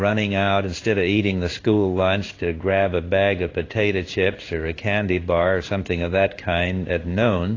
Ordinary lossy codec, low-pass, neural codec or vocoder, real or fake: AAC, 32 kbps; 7.2 kHz; codec, 16 kHz in and 24 kHz out, 1 kbps, XY-Tokenizer; fake